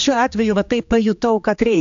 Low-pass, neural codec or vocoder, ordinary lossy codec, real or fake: 7.2 kHz; codec, 16 kHz, 2 kbps, X-Codec, HuBERT features, trained on general audio; AAC, 64 kbps; fake